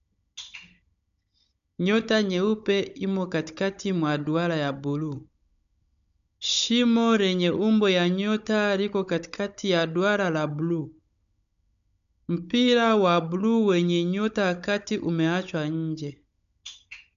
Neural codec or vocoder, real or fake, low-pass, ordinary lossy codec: codec, 16 kHz, 16 kbps, FunCodec, trained on Chinese and English, 50 frames a second; fake; 7.2 kHz; none